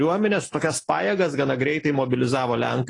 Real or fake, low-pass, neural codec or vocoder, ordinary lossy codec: real; 10.8 kHz; none; AAC, 32 kbps